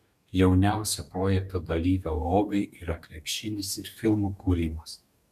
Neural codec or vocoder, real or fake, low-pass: codec, 44.1 kHz, 2.6 kbps, DAC; fake; 14.4 kHz